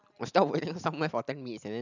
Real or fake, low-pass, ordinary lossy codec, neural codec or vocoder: real; 7.2 kHz; none; none